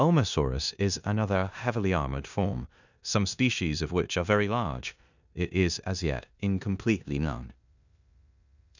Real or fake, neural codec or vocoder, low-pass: fake; codec, 16 kHz in and 24 kHz out, 0.9 kbps, LongCat-Audio-Codec, four codebook decoder; 7.2 kHz